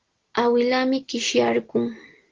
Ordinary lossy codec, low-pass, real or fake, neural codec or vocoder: Opus, 16 kbps; 7.2 kHz; real; none